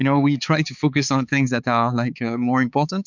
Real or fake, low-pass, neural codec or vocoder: fake; 7.2 kHz; codec, 16 kHz, 4 kbps, X-Codec, HuBERT features, trained on balanced general audio